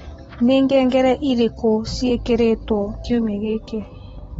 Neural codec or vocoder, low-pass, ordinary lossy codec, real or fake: vocoder, 44.1 kHz, 128 mel bands, Pupu-Vocoder; 19.8 kHz; AAC, 24 kbps; fake